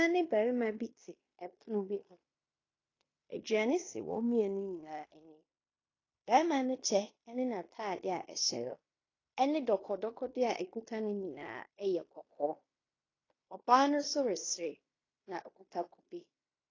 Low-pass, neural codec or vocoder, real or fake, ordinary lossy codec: 7.2 kHz; codec, 16 kHz in and 24 kHz out, 0.9 kbps, LongCat-Audio-Codec, fine tuned four codebook decoder; fake; AAC, 32 kbps